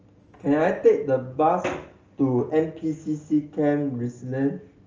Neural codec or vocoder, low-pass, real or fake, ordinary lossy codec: none; 7.2 kHz; real; Opus, 24 kbps